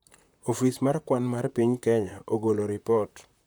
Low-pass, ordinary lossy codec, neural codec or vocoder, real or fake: none; none; vocoder, 44.1 kHz, 128 mel bands, Pupu-Vocoder; fake